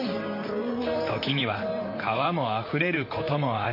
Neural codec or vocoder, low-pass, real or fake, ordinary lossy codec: vocoder, 44.1 kHz, 128 mel bands, Pupu-Vocoder; 5.4 kHz; fake; MP3, 32 kbps